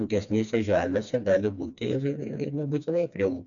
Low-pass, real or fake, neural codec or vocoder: 7.2 kHz; fake; codec, 16 kHz, 2 kbps, FreqCodec, smaller model